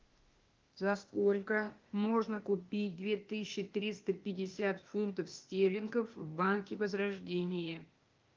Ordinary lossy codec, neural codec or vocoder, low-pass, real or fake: Opus, 32 kbps; codec, 16 kHz, 0.8 kbps, ZipCodec; 7.2 kHz; fake